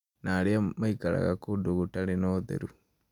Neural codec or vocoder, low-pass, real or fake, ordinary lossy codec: none; 19.8 kHz; real; none